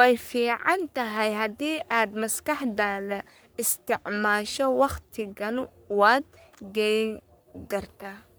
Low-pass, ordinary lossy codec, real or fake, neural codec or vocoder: none; none; fake; codec, 44.1 kHz, 3.4 kbps, Pupu-Codec